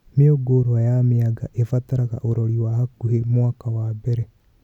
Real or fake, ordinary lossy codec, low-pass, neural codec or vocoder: real; none; 19.8 kHz; none